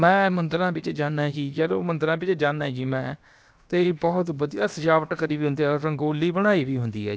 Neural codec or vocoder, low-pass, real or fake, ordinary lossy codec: codec, 16 kHz, about 1 kbps, DyCAST, with the encoder's durations; none; fake; none